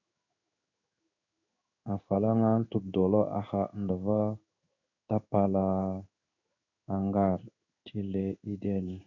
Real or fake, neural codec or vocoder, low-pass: fake; codec, 16 kHz in and 24 kHz out, 1 kbps, XY-Tokenizer; 7.2 kHz